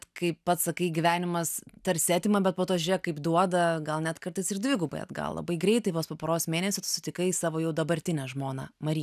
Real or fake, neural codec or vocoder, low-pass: real; none; 14.4 kHz